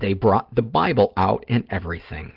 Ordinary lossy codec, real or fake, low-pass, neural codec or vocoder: Opus, 16 kbps; real; 5.4 kHz; none